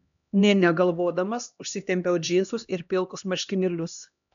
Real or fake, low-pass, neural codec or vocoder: fake; 7.2 kHz; codec, 16 kHz, 1 kbps, X-Codec, HuBERT features, trained on LibriSpeech